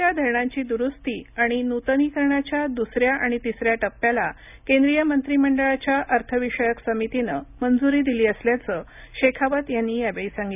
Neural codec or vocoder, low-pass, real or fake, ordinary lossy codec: none; 3.6 kHz; real; none